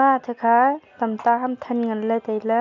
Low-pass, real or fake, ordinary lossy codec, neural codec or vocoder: 7.2 kHz; real; none; none